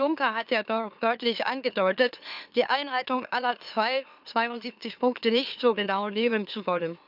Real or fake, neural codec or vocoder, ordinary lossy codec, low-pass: fake; autoencoder, 44.1 kHz, a latent of 192 numbers a frame, MeloTTS; none; 5.4 kHz